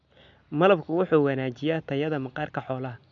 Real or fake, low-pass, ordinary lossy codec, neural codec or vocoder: real; 7.2 kHz; none; none